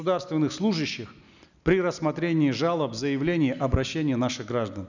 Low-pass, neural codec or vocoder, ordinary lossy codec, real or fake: 7.2 kHz; none; none; real